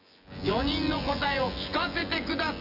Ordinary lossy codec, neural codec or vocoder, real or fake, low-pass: none; vocoder, 24 kHz, 100 mel bands, Vocos; fake; 5.4 kHz